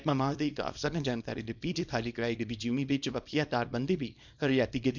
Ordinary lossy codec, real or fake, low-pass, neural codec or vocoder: none; fake; 7.2 kHz; codec, 24 kHz, 0.9 kbps, WavTokenizer, small release